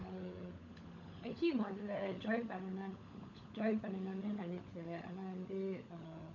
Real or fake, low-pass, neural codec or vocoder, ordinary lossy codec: fake; 7.2 kHz; codec, 16 kHz, 16 kbps, FunCodec, trained on LibriTTS, 50 frames a second; none